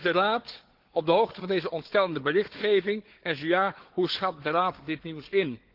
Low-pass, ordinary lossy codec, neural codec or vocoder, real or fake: 5.4 kHz; Opus, 16 kbps; codec, 16 kHz, 4 kbps, FunCodec, trained on Chinese and English, 50 frames a second; fake